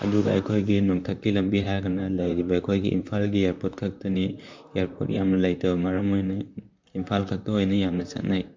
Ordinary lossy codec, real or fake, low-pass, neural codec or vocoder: MP3, 64 kbps; fake; 7.2 kHz; vocoder, 44.1 kHz, 128 mel bands, Pupu-Vocoder